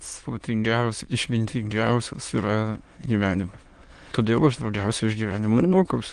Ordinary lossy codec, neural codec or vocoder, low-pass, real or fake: Opus, 24 kbps; autoencoder, 22.05 kHz, a latent of 192 numbers a frame, VITS, trained on many speakers; 9.9 kHz; fake